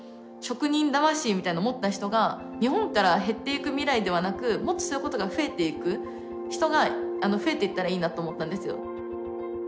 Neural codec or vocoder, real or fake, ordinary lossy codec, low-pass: none; real; none; none